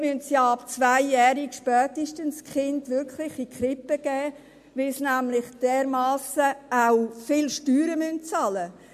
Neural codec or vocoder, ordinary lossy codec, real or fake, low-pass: none; MP3, 64 kbps; real; 14.4 kHz